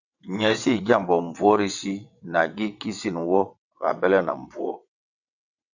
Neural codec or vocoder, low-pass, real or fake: vocoder, 22.05 kHz, 80 mel bands, WaveNeXt; 7.2 kHz; fake